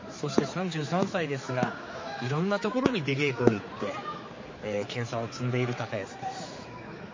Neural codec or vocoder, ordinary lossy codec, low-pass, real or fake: codec, 16 kHz, 4 kbps, X-Codec, HuBERT features, trained on general audio; MP3, 32 kbps; 7.2 kHz; fake